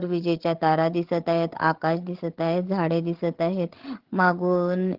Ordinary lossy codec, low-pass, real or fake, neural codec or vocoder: Opus, 16 kbps; 5.4 kHz; fake; codec, 16 kHz, 8 kbps, FreqCodec, larger model